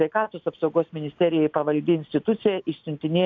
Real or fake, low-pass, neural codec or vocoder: real; 7.2 kHz; none